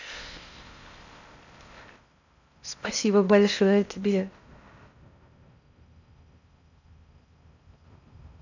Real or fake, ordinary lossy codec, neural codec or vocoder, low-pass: fake; none; codec, 16 kHz in and 24 kHz out, 0.6 kbps, FocalCodec, streaming, 4096 codes; 7.2 kHz